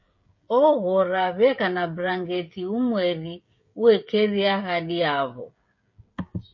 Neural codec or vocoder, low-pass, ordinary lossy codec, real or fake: codec, 16 kHz, 16 kbps, FreqCodec, smaller model; 7.2 kHz; MP3, 32 kbps; fake